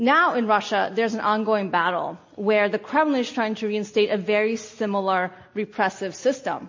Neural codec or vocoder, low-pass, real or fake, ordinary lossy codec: none; 7.2 kHz; real; MP3, 32 kbps